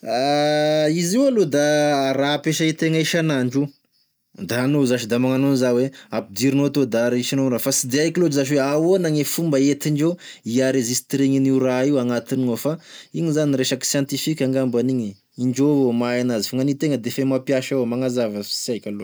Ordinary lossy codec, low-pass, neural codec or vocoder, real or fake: none; none; none; real